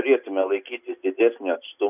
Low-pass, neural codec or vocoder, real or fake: 3.6 kHz; none; real